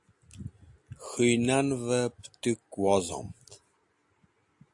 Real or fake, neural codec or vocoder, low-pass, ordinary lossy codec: real; none; 10.8 kHz; AAC, 64 kbps